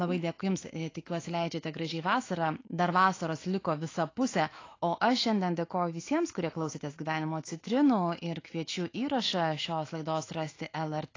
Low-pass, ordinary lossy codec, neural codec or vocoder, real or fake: 7.2 kHz; AAC, 32 kbps; autoencoder, 48 kHz, 128 numbers a frame, DAC-VAE, trained on Japanese speech; fake